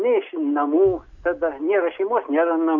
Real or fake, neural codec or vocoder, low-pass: real; none; 7.2 kHz